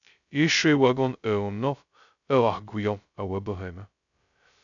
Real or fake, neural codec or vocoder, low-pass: fake; codec, 16 kHz, 0.2 kbps, FocalCodec; 7.2 kHz